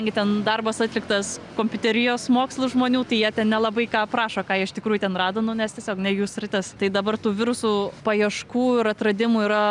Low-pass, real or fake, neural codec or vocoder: 10.8 kHz; real; none